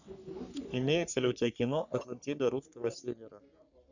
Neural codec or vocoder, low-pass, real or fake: codec, 44.1 kHz, 3.4 kbps, Pupu-Codec; 7.2 kHz; fake